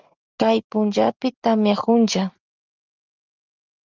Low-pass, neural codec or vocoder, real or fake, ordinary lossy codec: 7.2 kHz; none; real; Opus, 32 kbps